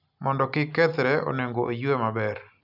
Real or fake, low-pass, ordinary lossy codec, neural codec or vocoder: real; 5.4 kHz; none; none